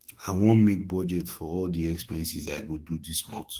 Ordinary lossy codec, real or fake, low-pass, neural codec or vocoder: Opus, 24 kbps; fake; 14.4 kHz; autoencoder, 48 kHz, 32 numbers a frame, DAC-VAE, trained on Japanese speech